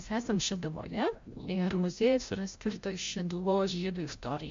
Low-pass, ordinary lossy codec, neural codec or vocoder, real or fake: 7.2 kHz; MP3, 48 kbps; codec, 16 kHz, 0.5 kbps, FreqCodec, larger model; fake